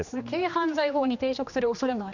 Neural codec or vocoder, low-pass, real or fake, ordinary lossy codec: codec, 16 kHz, 2 kbps, X-Codec, HuBERT features, trained on general audio; 7.2 kHz; fake; none